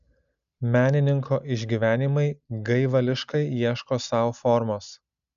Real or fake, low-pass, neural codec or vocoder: real; 7.2 kHz; none